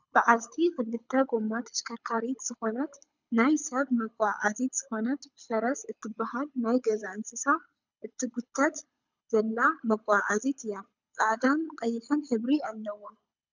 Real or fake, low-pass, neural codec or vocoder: fake; 7.2 kHz; codec, 24 kHz, 6 kbps, HILCodec